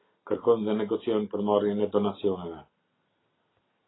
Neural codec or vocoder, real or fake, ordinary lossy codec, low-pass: none; real; AAC, 16 kbps; 7.2 kHz